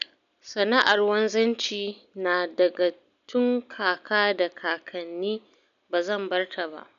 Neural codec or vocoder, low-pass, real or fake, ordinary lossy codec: none; 7.2 kHz; real; none